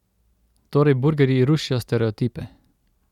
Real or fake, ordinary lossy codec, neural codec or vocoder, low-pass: real; none; none; 19.8 kHz